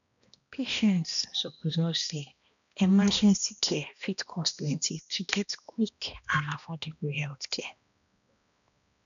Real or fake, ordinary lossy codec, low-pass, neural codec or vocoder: fake; none; 7.2 kHz; codec, 16 kHz, 1 kbps, X-Codec, HuBERT features, trained on balanced general audio